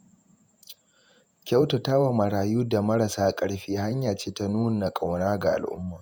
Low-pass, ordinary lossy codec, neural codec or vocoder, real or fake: none; none; vocoder, 48 kHz, 128 mel bands, Vocos; fake